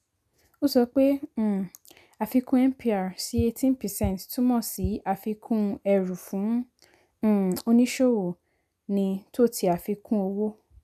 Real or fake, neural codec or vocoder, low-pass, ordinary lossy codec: real; none; 14.4 kHz; none